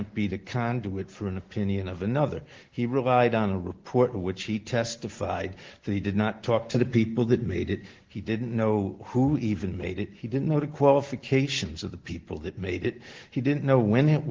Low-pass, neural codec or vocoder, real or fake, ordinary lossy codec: 7.2 kHz; none; real; Opus, 16 kbps